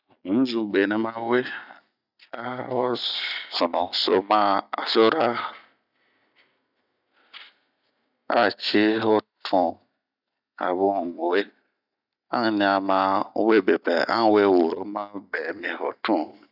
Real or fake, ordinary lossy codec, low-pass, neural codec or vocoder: real; none; 5.4 kHz; none